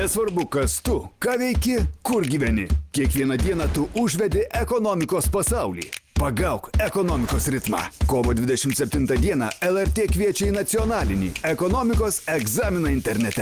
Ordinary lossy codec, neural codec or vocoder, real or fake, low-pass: Opus, 24 kbps; none; real; 14.4 kHz